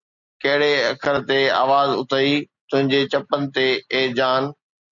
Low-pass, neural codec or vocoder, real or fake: 7.2 kHz; none; real